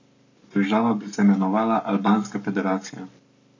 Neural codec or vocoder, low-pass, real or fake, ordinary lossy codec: codec, 44.1 kHz, 7.8 kbps, Pupu-Codec; 7.2 kHz; fake; MP3, 48 kbps